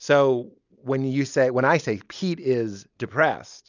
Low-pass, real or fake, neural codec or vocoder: 7.2 kHz; real; none